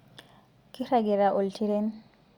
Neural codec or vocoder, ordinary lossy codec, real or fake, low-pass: none; Opus, 64 kbps; real; 19.8 kHz